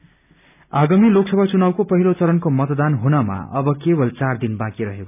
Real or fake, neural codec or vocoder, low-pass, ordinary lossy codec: real; none; 3.6 kHz; none